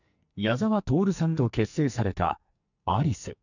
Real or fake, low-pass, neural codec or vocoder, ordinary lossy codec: fake; 7.2 kHz; codec, 44.1 kHz, 2.6 kbps, SNAC; none